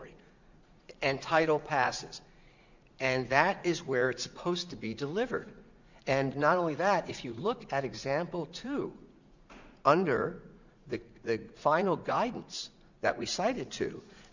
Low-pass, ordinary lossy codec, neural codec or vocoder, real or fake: 7.2 kHz; Opus, 64 kbps; vocoder, 44.1 kHz, 80 mel bands, Vocos; fake